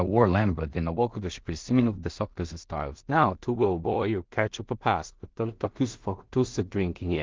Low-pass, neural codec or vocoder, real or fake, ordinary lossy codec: 7.2 kHz; codec, 16 kHz in and 24 kHz out, 0.4 kbps, LongCat-Audio-Codec, two codebook decoder; fake; Opus, 32 kbps